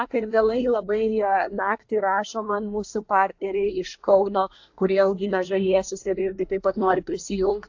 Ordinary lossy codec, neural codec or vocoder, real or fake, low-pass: AAC, 48 kbps; codec, 24 kHz, 1 kbps, SNAC; fake; 7.2 kHz